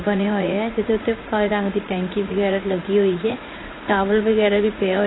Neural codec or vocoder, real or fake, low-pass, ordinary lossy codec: vocoder, 44.1 kHz, 80 mel bands, Vocos; fake; 7.2 kHz; AAC, 16 kbps